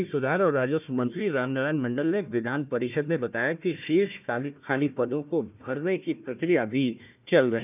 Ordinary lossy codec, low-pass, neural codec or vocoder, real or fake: none; 3.6 kHz; codec, 16 kHz, 1 kbps, FunCodec, trained on Chinese and English, 50 frames a second; fake